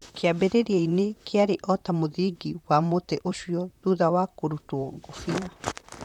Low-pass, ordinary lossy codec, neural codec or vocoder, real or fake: 19.8 kHz; none; vocoder, 44.1 kHz, 128 mel bands, Pupu-Vocoder; fake